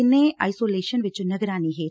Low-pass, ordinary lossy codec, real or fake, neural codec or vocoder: none; none; real; none